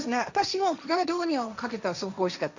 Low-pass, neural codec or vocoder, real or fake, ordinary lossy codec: 7.2 kHz; codec, 16 kHz, 1.1 kbps, Voila-Tokenizer; fake; none